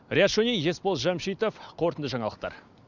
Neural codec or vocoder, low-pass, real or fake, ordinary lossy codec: none; 7.2 kHz; real; none